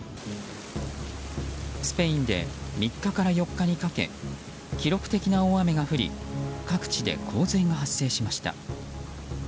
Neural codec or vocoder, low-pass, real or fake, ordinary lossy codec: none; none; real; none